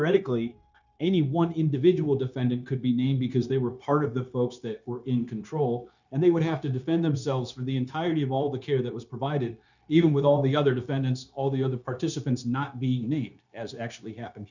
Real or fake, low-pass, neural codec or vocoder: fake; 7.2 kHz; codec, 16 kHz, 0.9 kbps, LongCat-Audio-Codec